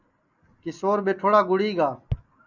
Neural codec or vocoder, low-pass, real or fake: none; 7.2 kHz; real